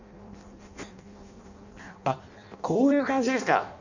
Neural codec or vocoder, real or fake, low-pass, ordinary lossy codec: codec, 16 kHz in and 24 kHz out, 0.6 kbps, FireRedTTS-2 codec; fake; 7.2 kHz; none